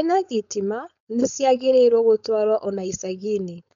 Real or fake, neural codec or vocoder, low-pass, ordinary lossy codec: fake; codec, 16 kHz, 4.8 kbps, FACodec; 7.2 kHz; none